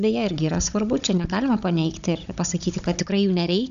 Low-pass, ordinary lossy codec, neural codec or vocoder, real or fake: 7.2 kHz; AAC, 96 kbps; codec, 16 kHz, 16 kbps, FunCodec, trained on Chinese and English, 50 frames a second; fake